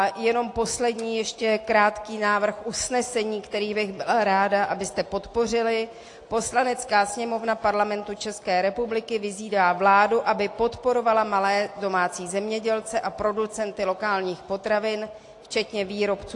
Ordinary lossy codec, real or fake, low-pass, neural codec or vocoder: AAC, 48 kbps; real; 10.8 kHz; none